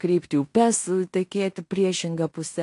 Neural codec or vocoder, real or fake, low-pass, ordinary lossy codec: codec, 16 kHz in and 24 kHz out, 0.9 kbps, LongCat-Audio-Codec, fine tuned four codebook decoder; fake; 10.8 kHz; AAC, 48 kbps